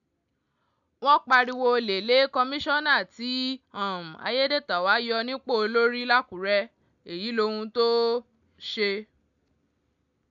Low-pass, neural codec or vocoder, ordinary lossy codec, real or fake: 7.2 kHz; none; none; real